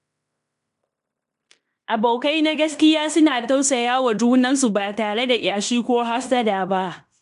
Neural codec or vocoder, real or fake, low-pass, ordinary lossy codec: codec, 16 kHz in and 24 kHz out, 0.9 kbps, LongCat-Audio-Codec, fine tuned four codebook decoder; fake; 10.8 kHz; none